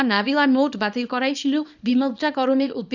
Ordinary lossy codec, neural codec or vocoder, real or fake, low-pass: none; codec, 24 kHz, 0.9 kbps, WavTokenizer, small release; fake; 7.2 kHz